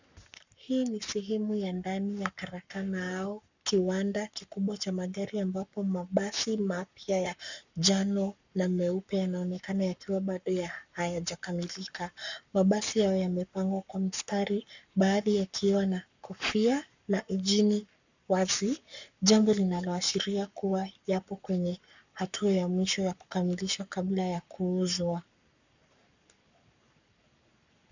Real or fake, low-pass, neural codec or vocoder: fake; 7.2 kHz; codec, 44.1 kHz, 7.8 kbps, Pupu-Codec